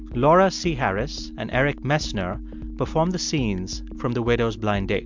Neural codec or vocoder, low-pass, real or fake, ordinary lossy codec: none; 7.2 kHz; real; MP3, 64 kbps